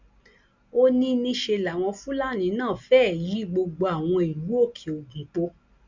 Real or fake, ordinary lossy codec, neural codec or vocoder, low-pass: real; Opus, 64 kbps; none; 7.2 kHz